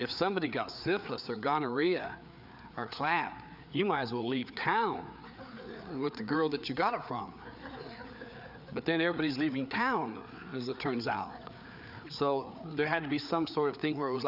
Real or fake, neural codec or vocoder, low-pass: fake; codec, 16 kHz, 4 kbps, FreqCodec, larger model; 5.4 kHz